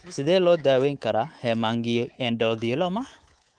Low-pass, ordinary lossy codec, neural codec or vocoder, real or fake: 9.9 kHz; Opus, 24 kbps; codec, 24 kHz, 3.1 kbps, DualCodec; fake